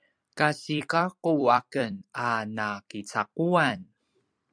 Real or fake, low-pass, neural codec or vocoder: fake; 9.9 kHz; vocoder, 44.1 kHz, 128 mel bands every 256 samples, BigVGAN v2